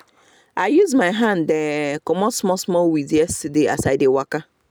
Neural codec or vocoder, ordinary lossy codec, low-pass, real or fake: none; none; none; real